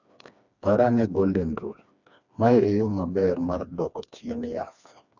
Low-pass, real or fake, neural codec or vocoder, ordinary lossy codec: 7.2 kHz; fake; codec, 16 kHz, 2 kbps, FreqCodec, smaller model; none